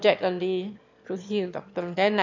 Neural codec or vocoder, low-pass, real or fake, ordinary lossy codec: autoencoder, 22.05 kHz, a latent of 192 numbers a frame, VITS, trained on one speaker; 7.2 kHz; fake; MP3, 64 kbps